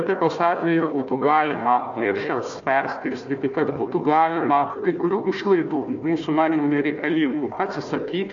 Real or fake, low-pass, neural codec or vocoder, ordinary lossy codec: fake; 7.2 kHz; codec, 16 kHz, 1 kbps, FunCodec, trained on Chinese and English, 50 frames a second; MP3, 48 kbps